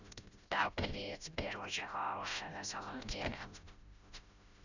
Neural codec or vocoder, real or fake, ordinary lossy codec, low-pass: codec, 16 kHz, 0.5 kbps, FreqCodec, smaller model; fake; none; 7.2 kHz